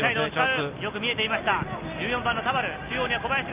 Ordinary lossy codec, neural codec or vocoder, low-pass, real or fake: Opus, 24 kbps; none; 3.6 kHz; real